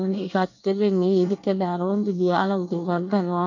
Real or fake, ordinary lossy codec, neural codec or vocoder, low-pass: fake; none; codec, 24 kHz, 1 kbps, SNAC; 7.2 kHz